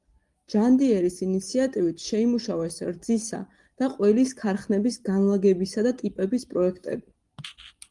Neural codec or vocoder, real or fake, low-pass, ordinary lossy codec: none; real; 10.8 kHz; Opus, 24 kbps